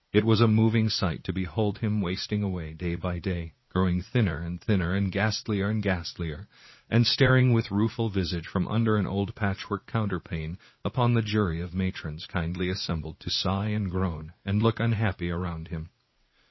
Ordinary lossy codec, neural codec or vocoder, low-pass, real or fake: MP3, 24 kbps; vocoder, 44.1 kHz, 80 mel bands, Vocos; 7.2 kHz; fake